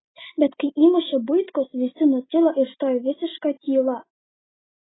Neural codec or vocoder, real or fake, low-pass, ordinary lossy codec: none; real; 7.2 kHz; AAC, 16 kbps